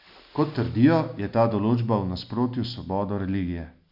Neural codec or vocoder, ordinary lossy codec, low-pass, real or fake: none; none; 5.4 kHz; real